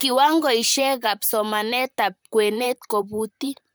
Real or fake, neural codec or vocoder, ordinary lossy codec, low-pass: fake; vocoder, 44.1 kHz, 128 mel bands, Pupu-Vocoder; none; none